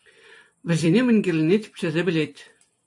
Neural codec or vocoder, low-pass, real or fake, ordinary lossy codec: vocoder, 44.1 kHz, 128 mel bands every 256 samples, BigVGAN v2; 10.8 kHz; fake; AAC, 64 kbps